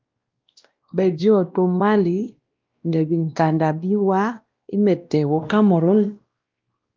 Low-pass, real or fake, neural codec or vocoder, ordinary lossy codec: 7.2 kHz; fake; codec, 16 kHz, 1 kbps, X-Codec, WavLM features, trained on Multilingual LibriSpeech; Opus, 32 kbps